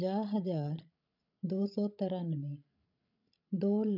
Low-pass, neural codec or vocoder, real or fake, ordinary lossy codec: 5.4 kHz; codec, 16 kHz, 16 kbps, FreqCodec, larger model; fake; none